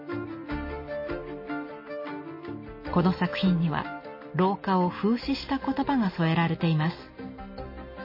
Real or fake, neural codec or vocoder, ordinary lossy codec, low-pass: real; none; MP3, 24 kbps; 5.4 kHz